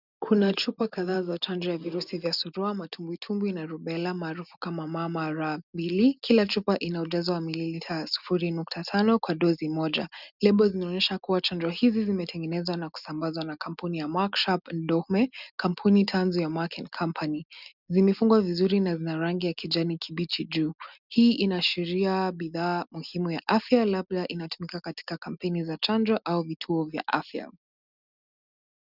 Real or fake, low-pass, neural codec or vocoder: real; 5.4 kHz; none